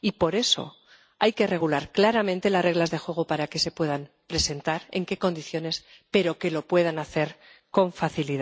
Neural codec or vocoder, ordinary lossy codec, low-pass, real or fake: none; none; none; real